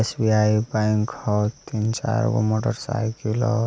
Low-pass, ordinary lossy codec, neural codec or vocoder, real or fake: none; none; none; real